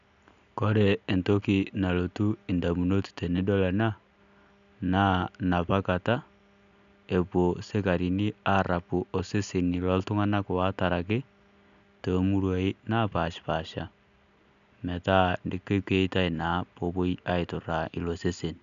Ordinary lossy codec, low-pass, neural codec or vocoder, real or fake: none; 7.2 kHz; none; real